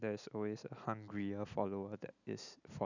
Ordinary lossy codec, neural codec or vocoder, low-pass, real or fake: none; none; 7.2 kHz; real